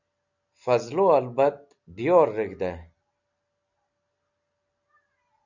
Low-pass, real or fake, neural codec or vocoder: 7.2 kHz; real; none